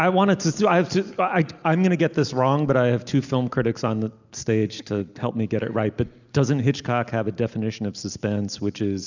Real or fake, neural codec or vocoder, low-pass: real; none; 7.2 kHz